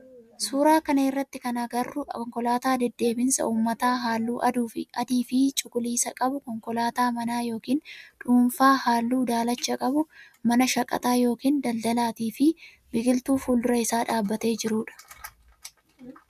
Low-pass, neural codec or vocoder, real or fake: 14.4 kHz; none; real